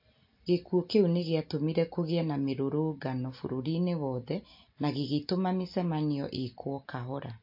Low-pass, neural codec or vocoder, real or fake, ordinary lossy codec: 5.4 kHz; none; real; MP3, 32 kbps